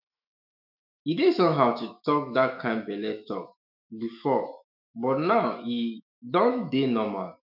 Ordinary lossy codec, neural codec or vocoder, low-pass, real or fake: none; autoencoder, 48 kHz, 128 numbers a frame, DAC-VAE, trained on Japanese speech; 5.4 kHz; fake